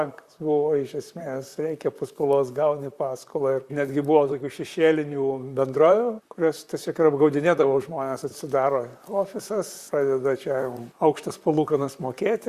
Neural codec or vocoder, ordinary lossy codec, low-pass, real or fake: vocoder, 44.1 kHz, 128 mel bands, Pupu-Vocoder; Opus, 64 kbps; 14.4 kHz; fake